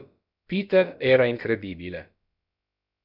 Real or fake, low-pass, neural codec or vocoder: fake; 5.4 kHz; codec, 16 kHz, about 1 kbps, DyCAST, with the encoder's durations